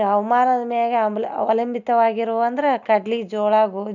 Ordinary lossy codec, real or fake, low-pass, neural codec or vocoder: none; real; 7.2 kHz; none